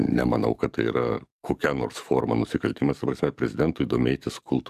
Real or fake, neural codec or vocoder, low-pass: fake; codec, 44.1 kHz, 7.8 kbps, DAC; 14.4 kHz